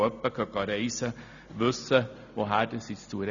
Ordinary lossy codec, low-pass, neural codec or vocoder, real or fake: none; 7.2 kHz; none; real